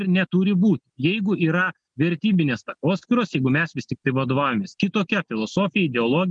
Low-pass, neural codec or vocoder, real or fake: 9.9 kHz; none; real